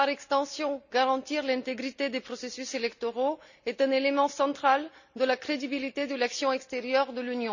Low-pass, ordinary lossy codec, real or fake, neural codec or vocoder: 7.2 kHz; none; real; none